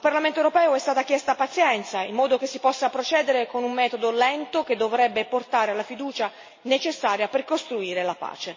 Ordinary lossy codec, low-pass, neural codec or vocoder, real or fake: MP3, 32 kbps; 7.2 kHz; none; real